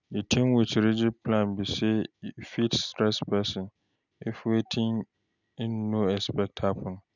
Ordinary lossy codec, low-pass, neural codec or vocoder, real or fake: none; 7.2 kHz; none; real